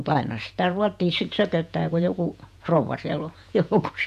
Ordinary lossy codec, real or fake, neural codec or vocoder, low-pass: MP3, 96 kbps; fake; vocoder, 48 kHz, 128 mel bands, Vocos; 14.4 kHz